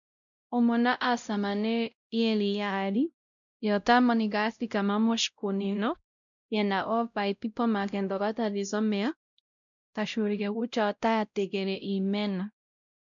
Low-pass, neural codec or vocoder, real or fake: 7.2 kHz; codec, 16 kHz, 0.5 kbps, X-Codec, WavLM features, trained on Multilingual LibriSpeech; fake